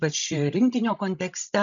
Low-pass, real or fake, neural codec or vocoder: 7.2 kHz; fake; codec, 16 kHz, 16 kbps, FreqCodec, larger model